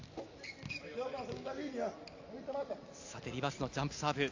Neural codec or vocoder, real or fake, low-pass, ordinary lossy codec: none; real; 7.2 kHz; AAC, 48 kbps